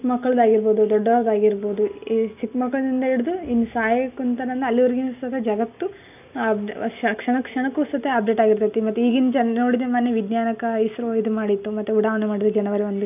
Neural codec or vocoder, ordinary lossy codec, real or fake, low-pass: none; none; real; 3.6 kHz